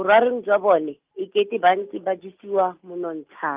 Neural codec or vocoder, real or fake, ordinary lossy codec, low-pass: none; real; Opus, 24 kbps; 3.6 kHz